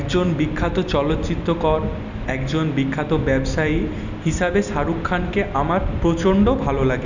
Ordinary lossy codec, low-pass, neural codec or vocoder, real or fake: none; 7.2 kHz; none; real